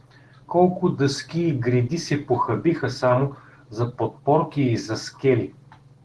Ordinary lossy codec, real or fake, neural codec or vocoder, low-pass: Opus, 16 kbps; real; none; 10.8 kHz